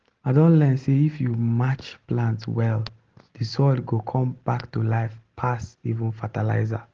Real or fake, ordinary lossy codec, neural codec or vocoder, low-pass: real; Opus, 16 kbps; none; 7.2 kHz